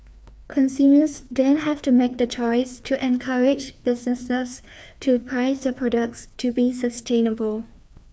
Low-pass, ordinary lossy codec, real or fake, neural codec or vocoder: none; none; fake; codec, 16 kHz, 2 kbps, FreqCodec, larger model